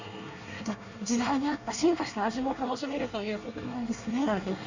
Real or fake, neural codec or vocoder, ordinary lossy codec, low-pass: fake; codec, 24 kHz, 1 kbps, SNAC; Opus, 64 kbps; 7.2 kHz